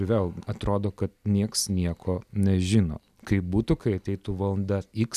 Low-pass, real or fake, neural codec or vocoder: 14.4 kHz; real; none